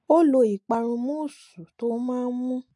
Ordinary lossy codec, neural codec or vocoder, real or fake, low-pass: MP3, 48 kbps; none; real; 10.8 kHz